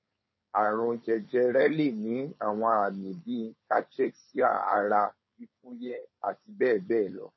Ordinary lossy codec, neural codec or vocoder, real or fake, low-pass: MP3, 24 kbps; codec, 16 kHz, 4.8 kbps, FACodec; fake; 7.2 kHz